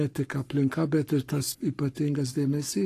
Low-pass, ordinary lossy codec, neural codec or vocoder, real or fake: 14.4 kHz; AAC, 48 kbps; codec, 44.1 kHz, 7.8 kbps, Pupu-Codec; fake